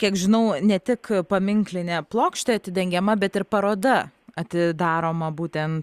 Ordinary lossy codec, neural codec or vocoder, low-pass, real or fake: Opus, 64 kbps; none; 14.4 kHz; real